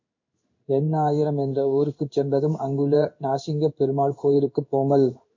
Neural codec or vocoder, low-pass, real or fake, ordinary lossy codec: codec, 16 kHz in and 24 kHz out, 1 kbps, XY-Tokenizer; 7.2 kHz; fake; MP3, 48 kbps